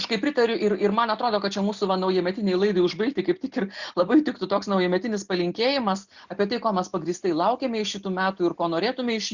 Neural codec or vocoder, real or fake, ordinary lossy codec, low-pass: none; real; Opus, 64 kbps; 7.2 kHz